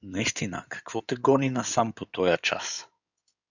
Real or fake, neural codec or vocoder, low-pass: fake; codec, 16 kHz in and 24 kHz out, 2.2 kbps, FireRedTTS-2 codec; 7.2 kHz